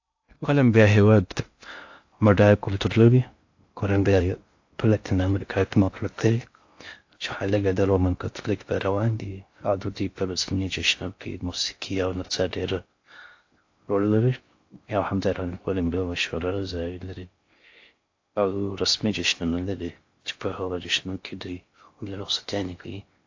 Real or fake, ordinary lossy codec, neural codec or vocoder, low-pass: fake; AAC, 48 kbps; codec, 16 kHz in and 24 kHz out, 0.6 kbps, FocalCodec, streaming, 2048 codes; 7.2 kHz